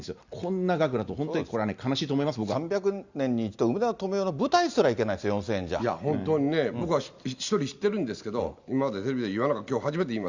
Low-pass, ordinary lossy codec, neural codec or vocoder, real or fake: 7.2 kHz; Opus, 64 kbps; none; real